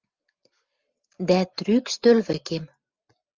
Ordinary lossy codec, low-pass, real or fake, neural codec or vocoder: Opus, 32 kbps; 7.2 kHz; real; none